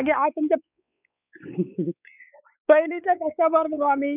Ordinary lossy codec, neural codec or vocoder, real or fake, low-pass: none; codec, 16 kHz, 4 kbps, X-Codec, WavLM features, trained on Multilingual LibriSpeech; fake; 3.6 kHz